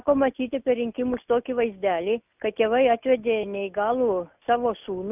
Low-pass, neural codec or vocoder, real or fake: 3.6 kHz; none; real